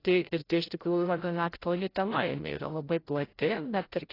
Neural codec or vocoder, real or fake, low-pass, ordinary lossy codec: codec, 16 kHz, 0.5 kbps, FreqCodec, larger model; fake; 5.4 kHz; AAC, 24 kbps